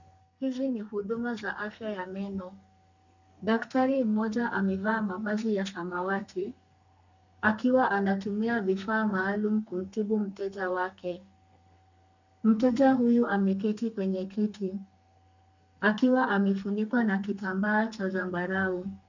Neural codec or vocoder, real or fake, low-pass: codec, 32 kHz, 1.9 kbps, SNAC; fake; 7.2 kHz